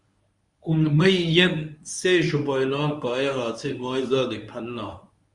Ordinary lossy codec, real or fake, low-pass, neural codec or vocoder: Opus, 64 kbps; fake; 10.8 kHz; codec, 24 kHz, 0.9 kbps, WavTokenizer, medium speech release version 1